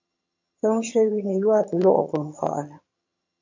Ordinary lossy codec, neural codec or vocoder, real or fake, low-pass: AAC, 48 kbps; vocoder, 22.05 kHz, 80 mel bands, HiFi-GAN; fake; 7.2 kHz